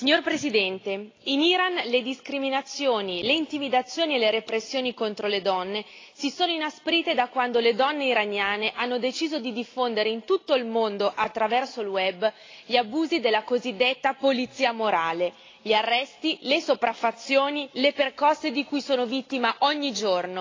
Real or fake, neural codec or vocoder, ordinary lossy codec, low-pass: real; none; AAC, 32 kbps; 7.2 kHz